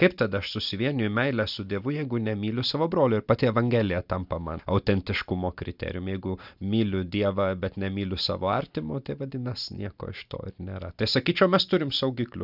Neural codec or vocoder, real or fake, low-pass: none; real; 5.4 kHz